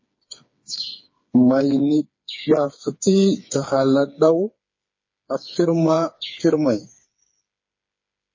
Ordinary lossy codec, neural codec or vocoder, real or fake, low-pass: MP3, 32 kbps; codec, 16 kHz, 4 kbps, FreqCodec, smaller model; fake; 7.2 kHz